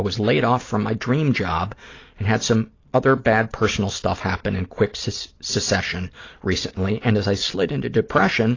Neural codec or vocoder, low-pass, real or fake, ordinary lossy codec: none; 7.2 kHz; real; AAC, 32 kbps